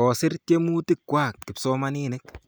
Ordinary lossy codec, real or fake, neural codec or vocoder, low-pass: none; real; none; none